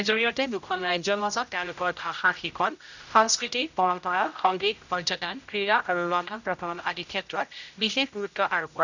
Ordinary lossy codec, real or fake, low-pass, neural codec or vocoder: none; fake; 7.2 kHz; codec, 16 kHz, 0.5 kbps, X-Codec, HuBERT features, trained on general audio